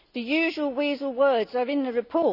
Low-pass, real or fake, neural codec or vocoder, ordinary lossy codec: 5.4 kHz; real; none; none